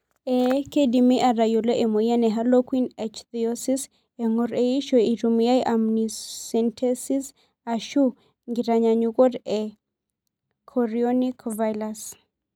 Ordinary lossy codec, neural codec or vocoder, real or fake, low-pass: none; none; real; 19.8 kHz